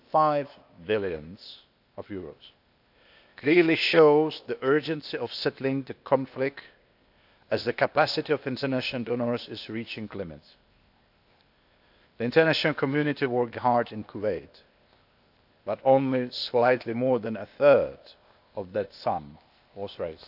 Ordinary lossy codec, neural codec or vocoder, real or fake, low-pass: none; codec, 16 kHz, 0.8 kbps, ZipCodec; fake; 5.4 kHz